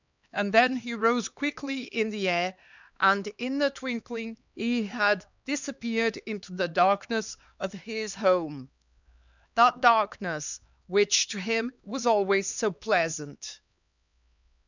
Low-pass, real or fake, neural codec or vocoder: 7.2 kHz; fake; codec, 16 kHz, 2 kbps, X-Codec, HuBERT features, trained on LibriSpeech